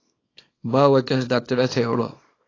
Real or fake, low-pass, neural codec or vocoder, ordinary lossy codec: fake; 7.2 kHz; codec, 24 kHz, 0.9 kbps, WavTokenizer, small release; AAC, 32 kbps